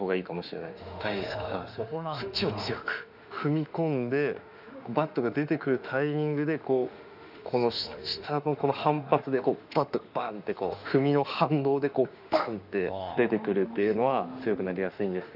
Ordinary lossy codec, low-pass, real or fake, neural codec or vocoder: none; 5.4 kHz; fake; autoencoder, 48 kHz, 32 numbers a frame, DAC-VAE, trained on Japanese speech